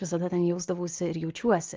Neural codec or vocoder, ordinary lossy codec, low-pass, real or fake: none; Opus, 24 kbps; 7.2 kHz; real